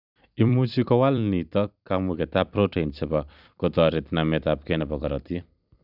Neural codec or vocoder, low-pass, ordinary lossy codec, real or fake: vocoder, 44.1 kHz, 128 mel bands every 256 samples, BigVGAN v2; 5.4 kHz; none; fake